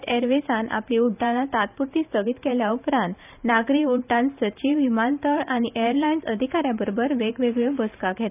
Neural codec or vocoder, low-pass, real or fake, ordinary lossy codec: vocoder, 44.1 kHz, 128 mel bands every 512 samples, BigVGAN v2; 3.6 kHz; fake; none